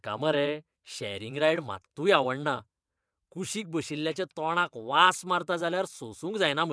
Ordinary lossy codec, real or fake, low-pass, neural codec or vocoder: none; fake; none; vocoder, 22.05 kHz, 80 mel bands, WaveNeXt